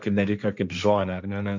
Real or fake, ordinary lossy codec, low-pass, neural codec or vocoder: fake; MP3, 64 kbps; 7.2 kHz; codec, 16 kHz, 1.1 kbps, Voila-Tokenizer